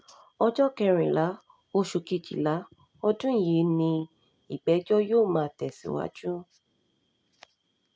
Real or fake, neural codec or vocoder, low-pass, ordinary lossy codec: real; none; none; none